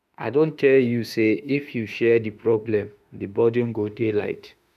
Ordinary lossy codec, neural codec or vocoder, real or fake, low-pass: none; autoencoder, 48 kHz, 32 numbers a frame, DAC-VAE, trained on Japanese speech; fake; 14.4 kHz